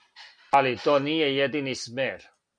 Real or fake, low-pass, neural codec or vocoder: real; 9.9 kHz; none